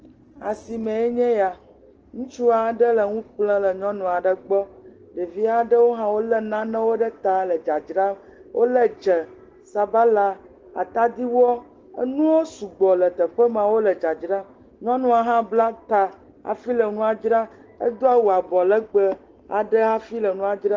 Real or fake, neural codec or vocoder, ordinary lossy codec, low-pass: real; none; Opus, 16 kbps; 7.2 kHz